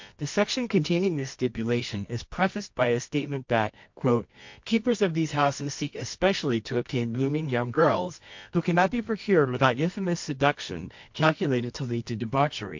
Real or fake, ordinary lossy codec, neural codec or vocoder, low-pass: fake; MP3, 48 kbps; codec, 24 kHz, 0.9 kbps, WavTokenizer, medium music audio release; 7.2 kHz